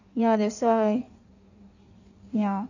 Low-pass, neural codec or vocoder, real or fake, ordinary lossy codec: 7.2 kHz; codec, 16 kHz in and 24 kHz out, 1.1 kbps, FireRedTTS-2 codec; fake; none